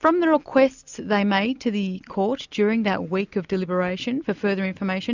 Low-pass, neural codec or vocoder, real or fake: 7.2 kHz; none; real